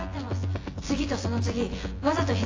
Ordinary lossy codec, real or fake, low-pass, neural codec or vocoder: AAC, 32 kbps; fake; 7.2 kHz; vocoder, 24 kHz, 100 mel bands, Vocos